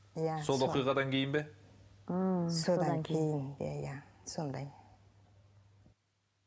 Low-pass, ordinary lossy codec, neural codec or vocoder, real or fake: none; none; none; real